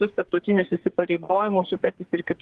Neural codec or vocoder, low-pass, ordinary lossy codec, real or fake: codec, 44.1 kHz, 2.6 kbps, SNAC; 10.8 kHz; Opus, 16 kbps; fake